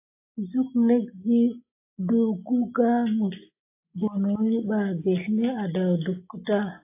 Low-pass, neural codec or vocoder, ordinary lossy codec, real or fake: 3.6 kHz; none; AAC, 24 kbps; real